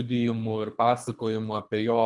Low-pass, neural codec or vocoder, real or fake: 10.8 kHz; codec, 24 kHz, 3 kbps, HILCodec; fake